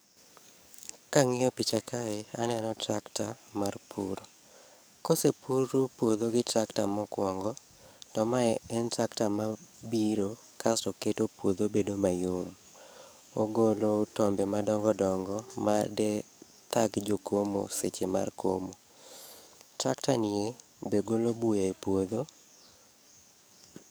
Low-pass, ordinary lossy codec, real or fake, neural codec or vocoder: none; none; fake; codec, 44.1 kHz, 7.8 kbps, DAC